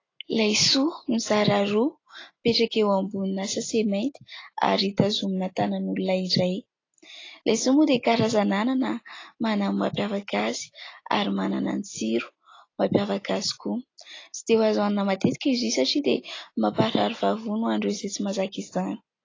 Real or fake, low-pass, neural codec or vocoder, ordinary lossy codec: real; 7.2 kHz; none; AAC, 32 kbps